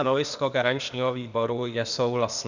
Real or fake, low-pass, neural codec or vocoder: fake; 7.2 kHz; codec, 16 kHz, 0.8 kbps, ZipCodec